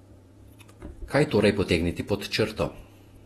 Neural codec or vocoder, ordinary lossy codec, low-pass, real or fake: vocoder, 48 kHz, 128 mel bands, Vocos; AAC, 32 kbps; 19.8 kHz; fake